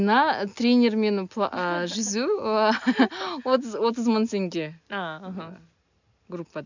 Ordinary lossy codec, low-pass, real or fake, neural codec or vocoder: none; 7.2 kHz; real; none